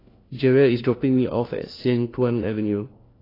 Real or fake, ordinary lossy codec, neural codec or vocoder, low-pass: fake; AAC, 24 kbps; codec, 16 kHz, 1 kbps, FunCodec, trained on LibriTTS, 50 frames a second; 5.4 kHz